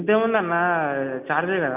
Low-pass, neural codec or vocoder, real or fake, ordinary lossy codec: 3.6 kHz; none; real; none